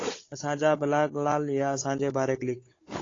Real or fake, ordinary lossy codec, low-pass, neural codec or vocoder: fake; AAC, 32 kbps; 7.2 kHz; codec, 16 kHz, 16 kbps, FunCodec, trained on Chinese and English, 50 frames a second